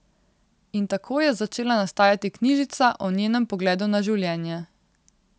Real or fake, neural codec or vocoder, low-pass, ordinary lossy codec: real; none; none; none